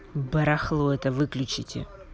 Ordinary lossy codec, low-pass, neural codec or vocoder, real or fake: none; none; none; real